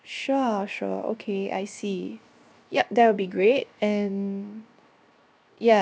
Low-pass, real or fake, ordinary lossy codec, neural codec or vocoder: none; fake; none; codec, 16 kHz, 0.7 kbps, FocalCodec